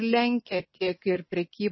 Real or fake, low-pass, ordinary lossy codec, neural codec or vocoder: real; 7.2 kHz; MP3, 24 kbps; none